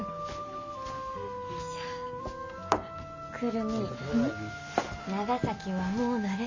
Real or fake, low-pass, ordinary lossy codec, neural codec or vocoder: real; 7.2 kHz; MP3, 64 kbps; none